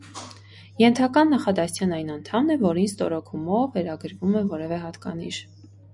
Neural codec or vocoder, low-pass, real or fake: none; 10.8 kHz; real